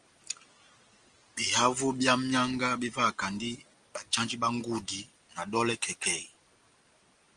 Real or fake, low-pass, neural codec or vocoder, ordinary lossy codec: real; 9.9 kHz; none; Opus, 24 kbps